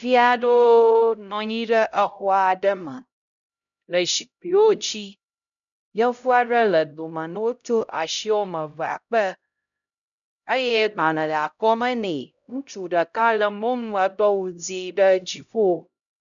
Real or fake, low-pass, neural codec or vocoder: fake; 7.2 kHz; codec, 16 kHz, 0.5 kbps, X-Codec, HuBERT features, trained on LibriSpeech